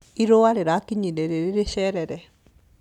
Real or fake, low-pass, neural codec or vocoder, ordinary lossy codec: real; 19.8 kHz; none; none